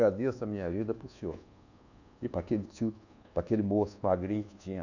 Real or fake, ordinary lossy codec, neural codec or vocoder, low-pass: fake; none; codec, 24 kHz, 1.2 kbps, DualCodec; 7.2 kHz